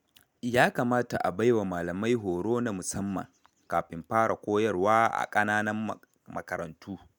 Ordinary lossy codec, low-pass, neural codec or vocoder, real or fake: none; none; none; real